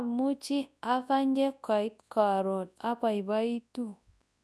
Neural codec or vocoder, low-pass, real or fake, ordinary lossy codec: codec, 24 kHz, 0.9 kbps, WavTokenizer, large speech release; none; fake; none